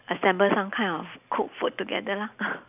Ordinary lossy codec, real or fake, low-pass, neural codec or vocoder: none; real; 3.6 kHz; none